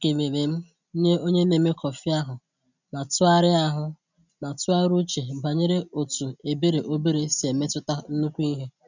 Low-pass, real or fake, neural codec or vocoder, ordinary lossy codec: 7.2 kHz; real; none; none